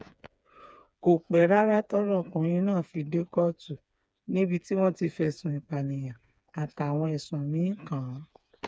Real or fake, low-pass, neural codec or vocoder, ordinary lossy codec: fake; none; codec, 16 kHz, 4 kbps, FreqCodec, smaller model; none